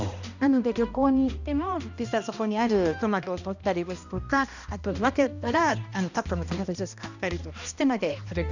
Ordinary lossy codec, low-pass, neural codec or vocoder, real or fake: none; 7.2 kHz; codec, 16 kHz, 1 kbps, X-Codec, HuBERT features, trained on balanced general audio; fake